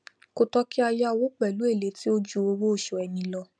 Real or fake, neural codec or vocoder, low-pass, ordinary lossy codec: real; none; 9.9 kHz; none